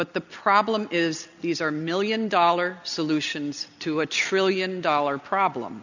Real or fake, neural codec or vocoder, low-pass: real; none; 7.2 kHz